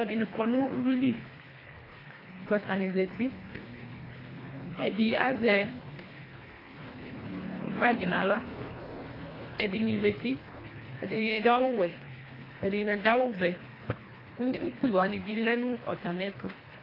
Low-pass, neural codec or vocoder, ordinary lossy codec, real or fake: 5.4 kHz; codec, 24 kHz, 1.5 kbps, HILCodec; AAC, 24 kbps; fake